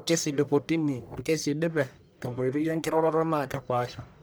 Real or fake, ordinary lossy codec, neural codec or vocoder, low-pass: fake; none; codec, 44.1 kHz, 1.7 kbps, Pupu-Codec; none